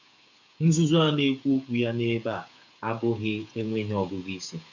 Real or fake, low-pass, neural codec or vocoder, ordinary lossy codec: fake; 7.2 kHz; codec, 16 kHz, 8 kbps, FreqCodec, smaller model; none